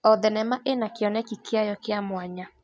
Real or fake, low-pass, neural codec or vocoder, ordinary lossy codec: real; none; none; none